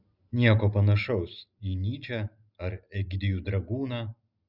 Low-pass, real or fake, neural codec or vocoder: 5.4 kHz; real; none